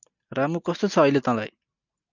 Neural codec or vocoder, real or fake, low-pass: none; real; 7.2 kHz